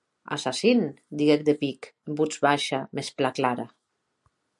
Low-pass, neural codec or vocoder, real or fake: 10.8 kHz; none; real